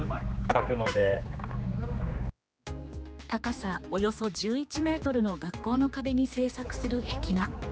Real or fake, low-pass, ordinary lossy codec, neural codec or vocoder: fake; none; none; codec, 16 kHz, 2 kbps, X-Codec, HuBERT features, trained on general audio